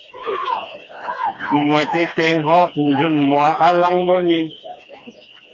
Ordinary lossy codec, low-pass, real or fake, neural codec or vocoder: AAC, 32 kbps; 7.2 kHz; fake; codec, 16 kHz, 2 kbps, FreqCodec, smaller model